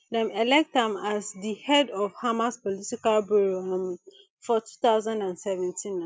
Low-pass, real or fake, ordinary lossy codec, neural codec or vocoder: none; real; none; none